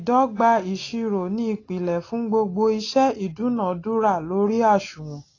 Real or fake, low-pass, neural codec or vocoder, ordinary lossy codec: real; 7.2 kHz; none; AAC, 32 kbps